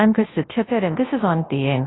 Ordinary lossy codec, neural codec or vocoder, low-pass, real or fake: AAC, 16 kbps; codec, 24 kHz, 0.9 kbps, WavTokenizer, large speech release; 7.2 kHz; fake